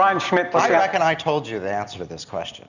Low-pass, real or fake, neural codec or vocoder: 7.2 kHz; real; none